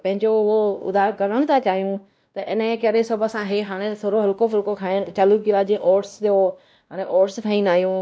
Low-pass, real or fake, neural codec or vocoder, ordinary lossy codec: none; fake; codec, 16 kHz, 1 kbps, X-Codec, WavLM features, trained on Multilingual LibriSpeech; none